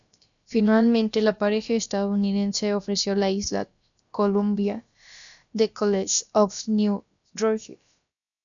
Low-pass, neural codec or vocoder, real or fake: 7.2 kHz; codec, 16 kHz, about 1 kbps, DyCAST, with the encoder's durations; fake